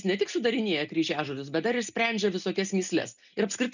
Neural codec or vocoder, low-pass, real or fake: none; 7.2 kHz; real